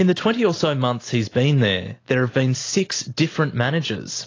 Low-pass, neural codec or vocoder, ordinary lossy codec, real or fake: 7.2 kHz; none; AAC, 32 kbps; real